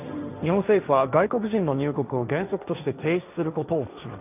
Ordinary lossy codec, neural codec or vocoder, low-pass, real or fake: none; codec, 16 kHz, 1.1 kbps, Voila-Tokenizer; 3.6 kHz; fake